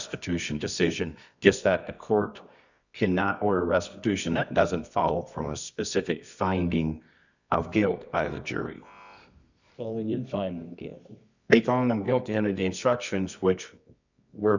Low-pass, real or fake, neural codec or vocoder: 7.2 kHz; fake; codec, 24 kHz, 0.9 kbps, WavTokenizer, medium music audio release